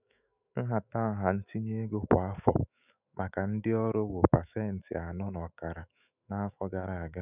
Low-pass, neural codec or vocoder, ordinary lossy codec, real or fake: 3.6 kHz; autoencoder, 48 kHz, 128 numbers a frame, DAC-VAE, trained on Japanese speech; none; fake